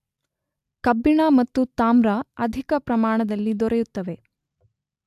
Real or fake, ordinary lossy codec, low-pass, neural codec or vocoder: real; AAC, 96 kbps; 14.4 kHz; none